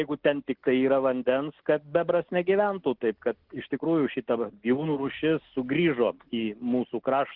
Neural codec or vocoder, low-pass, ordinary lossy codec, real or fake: none; 5.4 kHz; Opus, 16 kbps; real